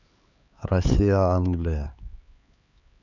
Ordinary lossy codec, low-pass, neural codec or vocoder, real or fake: none; 7.2 kHz; codec, 16 kHz, 4 kbps, X-Codec, HuBERT features, trained on LibriSpeech; fake